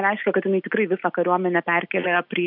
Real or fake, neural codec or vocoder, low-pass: real; none; 5.4 kHz